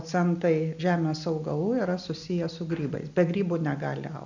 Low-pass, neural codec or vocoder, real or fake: 7.2 kHz; none; real